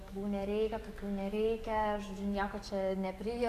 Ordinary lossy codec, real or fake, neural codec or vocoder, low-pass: AAC, 96 kbps; fake; codec, 44.1 kHz, 7.8 kbps, DAC; 14.4 kHz